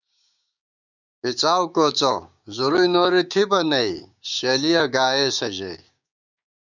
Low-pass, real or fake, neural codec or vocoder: 7.2 kHz; fake; codec, 16 kHz, 6 kbps, DAC